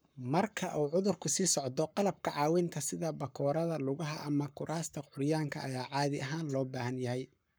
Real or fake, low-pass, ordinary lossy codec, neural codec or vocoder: fake; none; none; codec, 44.1 kHz, 7.8 kbps, Pupu-Codec